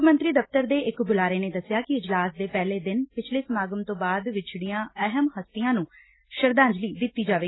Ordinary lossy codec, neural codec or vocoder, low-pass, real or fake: AAC, 16 kbps; none; 7.2 kHz; real